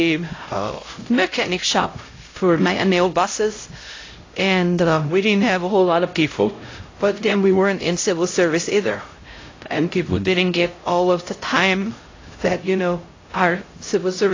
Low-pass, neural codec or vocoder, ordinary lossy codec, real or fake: 7.2 kHz; codec, 16 kHz, 0.5 kbps, X-Codec, HuBERT features, trained on LibriSpeech; AAC, 32 kbps; fake